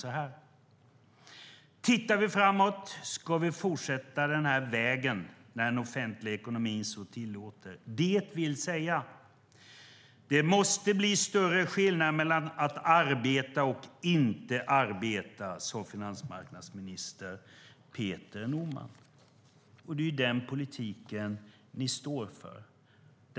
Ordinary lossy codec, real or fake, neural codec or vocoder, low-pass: none; real; none; none